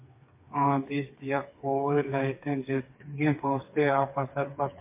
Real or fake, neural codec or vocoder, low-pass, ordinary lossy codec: fake; codec, 16 kHz, 4 kbps, FreqCodec, smaller model; 3.6 kHz; MP3, 24 kbps